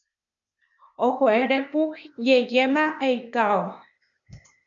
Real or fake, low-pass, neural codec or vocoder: fake; 7.2 kHz; codec, 16 kHz, 0.8 kbps, ZipCodec